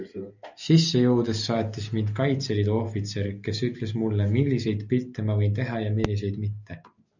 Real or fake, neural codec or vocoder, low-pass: real; none; 7.2 kHz